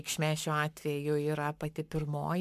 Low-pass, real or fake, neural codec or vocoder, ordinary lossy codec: 14.4 kHz; fake; codec, 44.1 kHz, 7.8 kbps, Pupu-Codec; MP3, 96 kbps